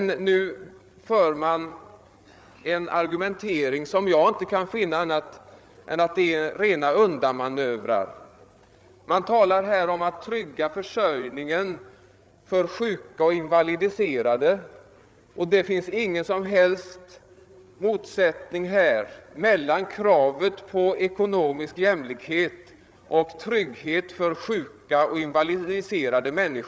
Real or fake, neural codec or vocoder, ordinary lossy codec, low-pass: fake; codec, 16 kHz, 8 kbps, FreqCodec, larger model; none; none